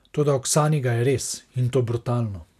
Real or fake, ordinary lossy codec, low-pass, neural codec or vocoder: real; none; 14.4 kHz; none